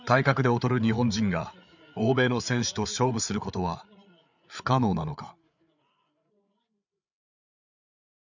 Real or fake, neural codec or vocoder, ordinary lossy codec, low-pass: fake; codec, 16 kHz, 8 kbps, FreqCodec, larger model; none; 7.2 kHz